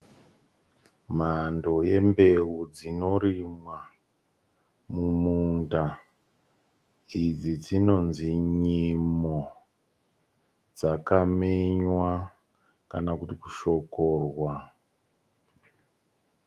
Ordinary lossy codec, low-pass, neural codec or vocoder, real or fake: Opus, 32 kbps; 14.4 kHz; autoencoder, 48 kHz, 128 numbers a frame, DAC-VAE, trained on Japanese speech; fake